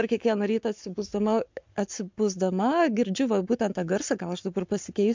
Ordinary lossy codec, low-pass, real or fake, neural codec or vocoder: MP3, 64 kbps; 7.2 kHz; fake; codec, 44.1 kHz, 7.8 kbps, Pupu-Codec